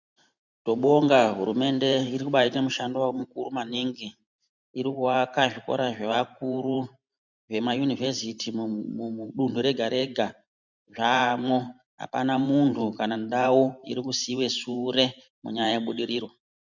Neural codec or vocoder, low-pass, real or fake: vocoder, 44.1 kHz, 128 mel bands every 512 samples, BigVGAN v2; 7.2 kHz; fake